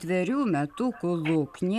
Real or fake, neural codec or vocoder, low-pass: real; none; 14.4 kHz